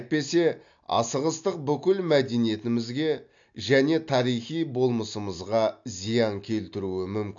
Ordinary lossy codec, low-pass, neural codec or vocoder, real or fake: AAC, 64 kbps; 7.2 kHz; none; real